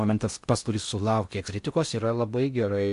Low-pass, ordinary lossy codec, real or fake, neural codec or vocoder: 10.8 kHz; MP3, 64 kbps; fake; codec, 16 kHz in and 24 kHz out, 0.6 kbps, FocalCodec, streaming, 4096 codes